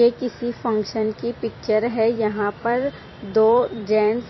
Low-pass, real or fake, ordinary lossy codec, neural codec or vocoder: 7.2 kHz; fake; MP3, 24 kbps; vocoder, 44.1 kHz, 128 mel bands every 256 samples, BigVGAN v2